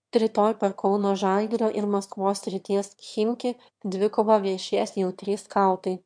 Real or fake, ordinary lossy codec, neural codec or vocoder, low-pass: fake; MP3, 64 kbps; autoencoder, 22.05 kHz, a latent of 192 numbers a frame, VITS, trained on one speaker; 9.9 kHz